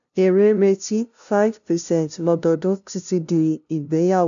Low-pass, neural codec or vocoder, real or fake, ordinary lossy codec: 7.2 kHz; codec, 16 kHz, 0.5 kbps, FunCodec, trained on LibriTTS, 25 frames a second; fake; none